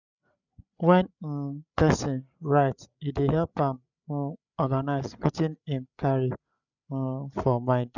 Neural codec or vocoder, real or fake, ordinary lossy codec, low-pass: codec, 16 kHz, 8 kbps, FreqCodec, larger model; fake; none; 7.2 kHz